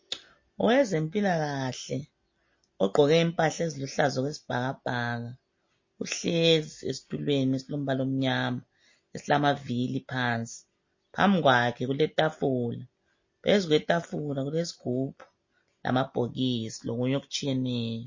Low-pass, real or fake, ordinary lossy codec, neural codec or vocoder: 7.2 kHz; real; MP3, 32 kbps; none